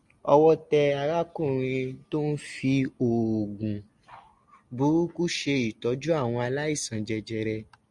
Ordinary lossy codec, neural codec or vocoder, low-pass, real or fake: Opus, 64 kbps; none; 10.8 kHz; real